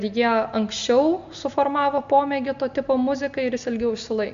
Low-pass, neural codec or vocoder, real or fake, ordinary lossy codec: 7.2 kHz; none; real; MP3, 64 kbps